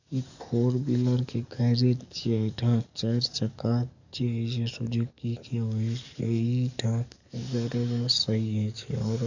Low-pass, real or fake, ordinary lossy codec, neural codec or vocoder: 7.2 kHz; fake; none; codec, 44.1 kHz, 7.8 kbps, DAC